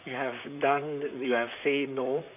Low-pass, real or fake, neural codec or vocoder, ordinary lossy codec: 3.6 kHz; fake; vocoder, 44.1 kHz, 128 mel bands, Pupu-Vocoder; none